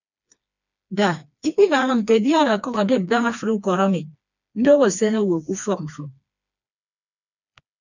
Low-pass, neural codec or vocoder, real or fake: 7.2 kHz; codec, 16 kHz, 2 kbps, FreqCodec, smaller model; fake